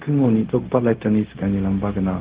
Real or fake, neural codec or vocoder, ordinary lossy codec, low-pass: fake; codec, 16 kHz, 0.4 kbps, LongCat-Audio-Codec; Opus, 16 kbps; 3.6 kHz